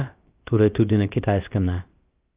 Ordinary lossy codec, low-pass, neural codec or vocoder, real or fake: Opus, 32 kbps; 3.6 kHz; codec, 16 kHz, about 1 kbps, DyCAST, with the encoder's durations; fake